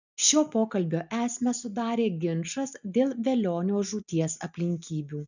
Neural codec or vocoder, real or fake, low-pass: none; real; 7.2 kHz